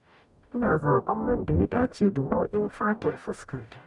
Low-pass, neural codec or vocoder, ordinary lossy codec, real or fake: 10.8 kHz; codec, 44.1 kHz, 0.9 kbps, DAC; none; fake